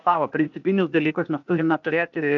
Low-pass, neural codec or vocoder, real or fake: 7.2 kHz; codec, 16 kHz, 0.8 kbps, ZipCodec; fake